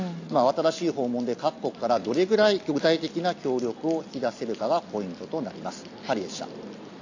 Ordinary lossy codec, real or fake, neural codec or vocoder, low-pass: AAC, 48 kbps; real; none; 7.2 kHz